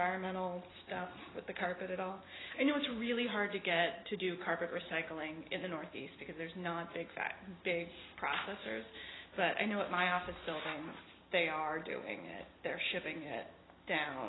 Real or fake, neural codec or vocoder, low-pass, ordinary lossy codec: real; none; 7.2 kHz; AAC, 16 kbps